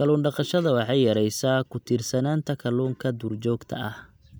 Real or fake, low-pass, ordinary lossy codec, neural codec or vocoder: real; none; none; none